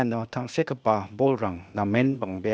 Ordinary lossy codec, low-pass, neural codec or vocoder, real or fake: none; none; codec, 16 kHz, 0.8 kbps, ZipCodec; fake